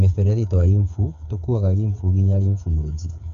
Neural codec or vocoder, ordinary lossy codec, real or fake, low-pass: codec, 16 kHz, 8 kbps, FreqCodec, smaller model; MP3, 96 kbps; fake; 7.2 kHz